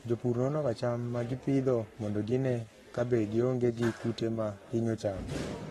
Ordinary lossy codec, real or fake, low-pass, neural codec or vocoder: AAC, 32 kbps; fake; 19.8 kHz; codec, 44.1 kHz, 7.8 kbps, Pupu-Codec